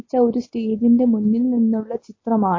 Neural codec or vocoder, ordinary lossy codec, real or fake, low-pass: none; MP3, 32 kbps; real; 7.2 kHz